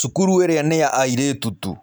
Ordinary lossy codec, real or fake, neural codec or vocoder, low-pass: none; real; none; none